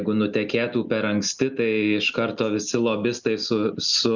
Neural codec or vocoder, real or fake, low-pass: none; real; 7.2 kHz